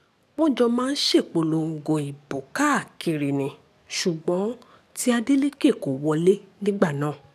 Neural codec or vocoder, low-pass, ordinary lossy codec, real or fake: codec, 44.1 kHz, 7.8 kbps, DAC; 14.4 kHz; none; fake